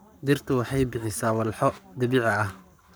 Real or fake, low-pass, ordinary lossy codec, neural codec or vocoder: fake; none; none; codec, 44.1 kHz, 7.8 kbps, Pupu-Codec